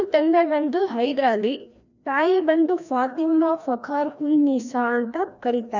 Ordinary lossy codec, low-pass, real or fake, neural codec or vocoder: none; 7.2 kHz; fake; codec, 16 kHz, 1 kbps, FreqCodec, larger model